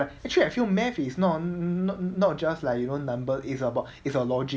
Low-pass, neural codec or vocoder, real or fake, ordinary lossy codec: none; none; real; none